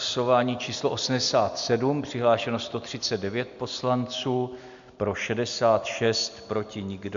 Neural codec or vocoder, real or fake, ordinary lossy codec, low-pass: none; real; MP3, 64 kbps; 7.2 kHz